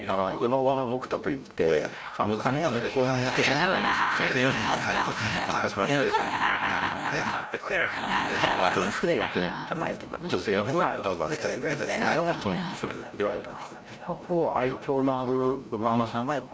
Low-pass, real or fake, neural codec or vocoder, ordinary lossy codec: none; fake; codec, 16 kHz, 0.5 kbps, FreqCodec, larger model; none